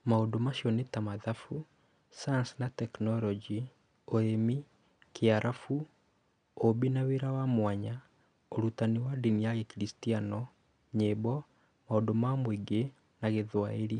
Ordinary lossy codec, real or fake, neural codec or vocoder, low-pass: none; real; none; 9.9 kHz